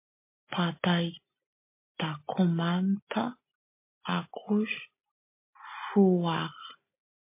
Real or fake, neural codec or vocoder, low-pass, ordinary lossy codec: real; none; 3.6 kHz; MP3, 24 kbps